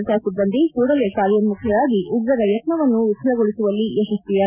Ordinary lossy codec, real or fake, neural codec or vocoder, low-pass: none; real; none; 3.6 kHz